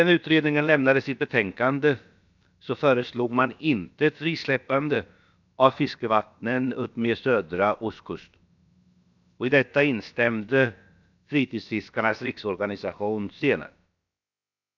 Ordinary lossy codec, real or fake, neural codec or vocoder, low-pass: none; fake; codec, 16 kHz, about 1 kbps, DyCAST, with the encoder's durations; 7.2 kHz